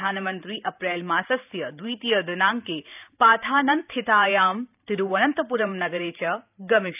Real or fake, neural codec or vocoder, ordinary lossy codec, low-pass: fake; vocoder, 44.1 kHz, 128 mel bands every 512 samples, BigVGAN v2; none; 3.6 kHz